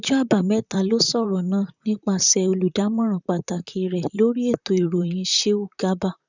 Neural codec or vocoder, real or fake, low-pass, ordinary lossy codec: vocoder, 44.1 kHz, 128 mel bands, Pupu-Vocoder; fake; 7.2 kHz; none